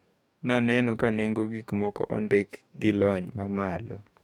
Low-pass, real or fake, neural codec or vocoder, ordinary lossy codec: 19.8 kHz; fake; codec, 44.1 kHz, 2.6 kbps, DAC; none